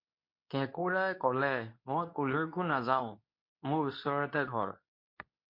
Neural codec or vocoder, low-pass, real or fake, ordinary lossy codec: codec, 24 kHz, 0.9 kbps, WavTokenizer, medium speech release version 2; 5.4 kHz; fake; MP3, 32 kbps